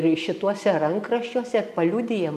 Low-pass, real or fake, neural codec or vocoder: 14.4 kHz; real; none